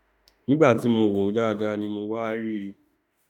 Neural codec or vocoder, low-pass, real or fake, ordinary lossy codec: autoencoder, 48 kHz, 32 numbers a frame, DAC-VAE, trained on Japanese speech; 19.8 kHz; fake; none